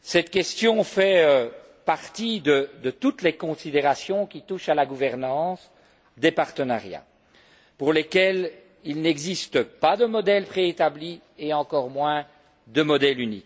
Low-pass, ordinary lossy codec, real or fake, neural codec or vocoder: none; none; real; none